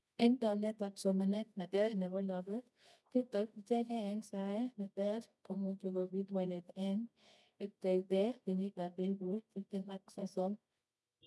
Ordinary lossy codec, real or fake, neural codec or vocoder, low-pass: none; fake; codec, 24 kHz, 0.9 kbps, WavTokenizer, medium music audio release; none